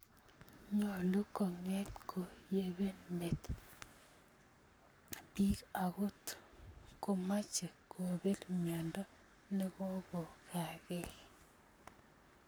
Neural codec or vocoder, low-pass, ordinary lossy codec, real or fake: codec, 44.1 kHz, 7.8 kbps, Pupu-Codec; none; none; fake